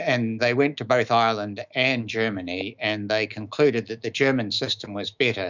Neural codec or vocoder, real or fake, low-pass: autoencoder, 48 kHz, 128 numbers a frame, DAC-VAE, trained on Japanese speech; fake; 7.2 kHz